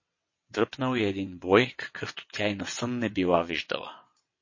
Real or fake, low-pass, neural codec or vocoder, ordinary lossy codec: fake; 7.2 kHz; vocoder, 22.05 kHz, 80 mel bands, WaveNeXt; MP3, 32 kbps